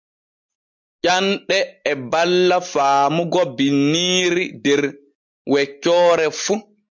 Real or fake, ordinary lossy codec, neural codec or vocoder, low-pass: real; MP3, 64 kbps; none; 7.2 kHz